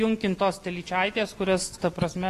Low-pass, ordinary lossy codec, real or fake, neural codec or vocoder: 14.4 kHz; AAC, 48 kbps; real; none